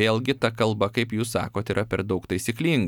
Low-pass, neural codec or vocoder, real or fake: 19.8 kHz; none; real